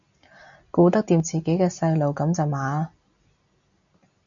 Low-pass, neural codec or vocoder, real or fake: 7.2 kHz; none; real